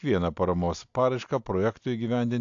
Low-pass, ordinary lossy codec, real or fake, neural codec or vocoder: 7.2 kHz; AAC, 64 kbps; real; none